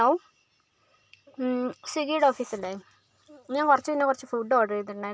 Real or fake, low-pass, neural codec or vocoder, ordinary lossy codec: real; none; none; none